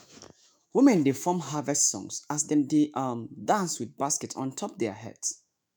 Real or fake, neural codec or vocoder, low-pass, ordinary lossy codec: fake; autoencoder, 48 kHz, 128 numbers a frame, DAC-VAE, trained on Japanese speech; none; none